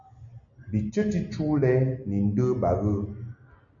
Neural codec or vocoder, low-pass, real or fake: none; 7.2 kHz; real